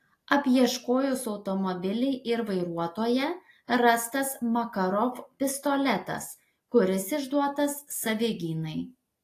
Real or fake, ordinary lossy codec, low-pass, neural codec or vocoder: real; AAC, 48 kbps; 14.4 kHz; none